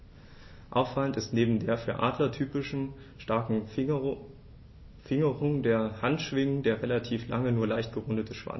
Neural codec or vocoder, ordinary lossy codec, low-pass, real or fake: none; MP3, 24 kbps; 7.2 kHz; real